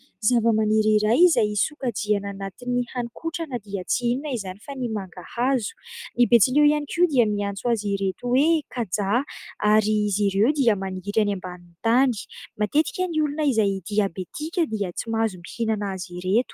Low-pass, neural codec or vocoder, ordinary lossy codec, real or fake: 14.4 kHz; none; Opus, 32 kbps; real